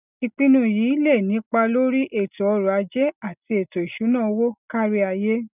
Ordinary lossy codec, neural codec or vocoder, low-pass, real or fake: none; none; 3.6 kHz; real